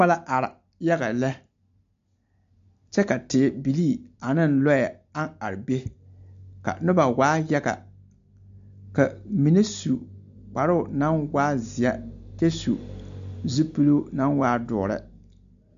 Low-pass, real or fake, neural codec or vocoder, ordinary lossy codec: 7.2 kHz; real; none; AAC, 64 kbps